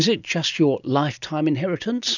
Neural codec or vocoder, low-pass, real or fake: none; 7.2 kHz; real